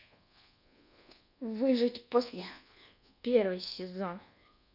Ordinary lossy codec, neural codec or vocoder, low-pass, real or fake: none; codec, 24 kHz, 1.2 kbps, DualCodec; 5.4 kHz; fake